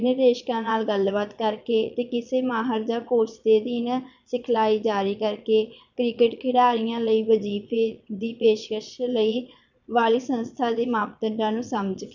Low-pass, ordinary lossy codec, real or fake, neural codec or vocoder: 7.2 kHz; none; fake; vocoder, 22.05 kHz, 80 mel bands, Vocos